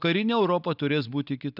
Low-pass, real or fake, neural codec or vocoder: 5.4 kHz; real; none